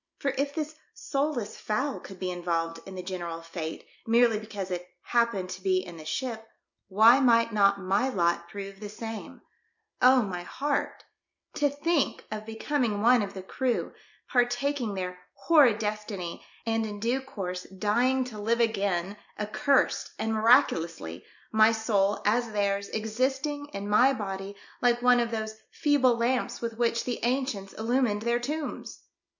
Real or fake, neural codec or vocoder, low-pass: real; none; 7.2 kHz